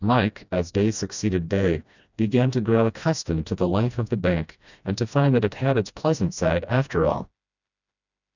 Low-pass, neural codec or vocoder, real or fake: 7.2 kHz; codec, 16 kHz, 1 kbps, FreqCodec, smaller model; fake